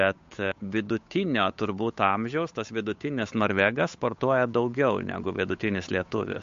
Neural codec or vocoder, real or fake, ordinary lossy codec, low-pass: codec, 16 kHz, 8 kbps, FunCodec, trained on Chinese and English, 25 frames a second; fake; MP3, 48 kbps; 7.2 kHz